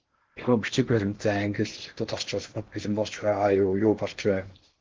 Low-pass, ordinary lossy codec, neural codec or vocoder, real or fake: 7.2 kHz; Opus, 16 kbps; codec, 16 kHz in and 24 kHz out, 0.6 kbps, FocalCodec, streaming, 4096 codes; fake